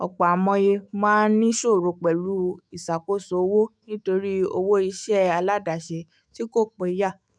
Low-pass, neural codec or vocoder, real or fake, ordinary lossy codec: 9.9 kHz; autoencoder, 48 kHz, 128 numbers a frame, DAC-VAE, trained on Japanese speech; fake; none